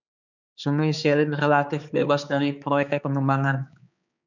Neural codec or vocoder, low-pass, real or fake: codec, 16 kHz, 4 kbps, X-Codec, HuBERT features, trained on balanced general audio; 7.2 kHz; fake